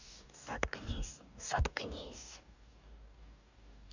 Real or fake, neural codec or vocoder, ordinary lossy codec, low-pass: fake; codec, 44.1 kHz, 2.6 kbps, DAC; none; 7.2 kHz